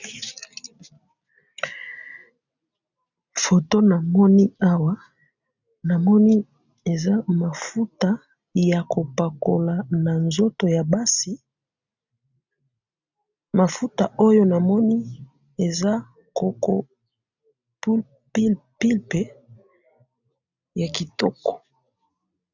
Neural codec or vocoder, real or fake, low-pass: none; real; 7.2 kHz